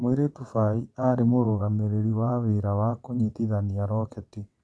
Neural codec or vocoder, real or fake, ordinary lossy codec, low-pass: vocoder, 22.05 kHz, 80 mel bands, WaveNeXt; fake; none; none